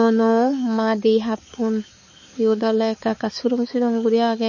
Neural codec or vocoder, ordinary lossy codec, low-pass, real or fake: codec, 16 kHz, 4 kbps, X-Codec, HuBERT features, trained on balanced general audio; MP3, 32 kbps; 7.2 kHz; fake